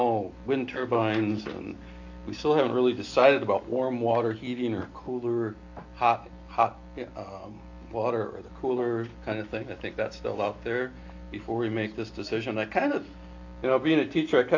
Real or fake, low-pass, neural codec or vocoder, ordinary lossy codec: real; 7.2 kHz; none; AAC, 48 kbps